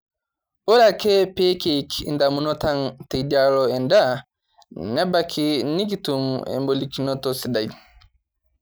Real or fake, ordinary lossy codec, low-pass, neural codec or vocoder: real; none; none; none